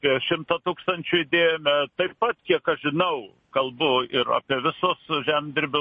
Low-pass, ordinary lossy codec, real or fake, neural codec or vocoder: 10.8 kHz; MP3, 32 kbps; real; none